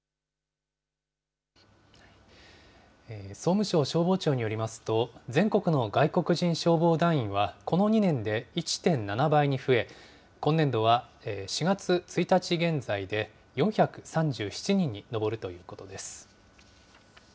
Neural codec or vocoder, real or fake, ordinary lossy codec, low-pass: none; real; none; none